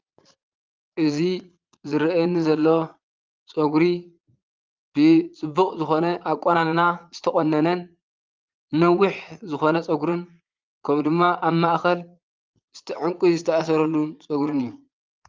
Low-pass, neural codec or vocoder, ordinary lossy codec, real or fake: 7.2 kHz; vocoder, 22.05 kHz, 80 mel bands, Vocos; Opus, 24 kbps; fake